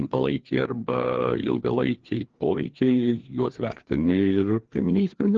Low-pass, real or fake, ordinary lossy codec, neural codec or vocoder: 7.2 kHz; fake; Opus, 16 kbps; codec, 16 kHz, 2 kbps, FreqCodec, larger model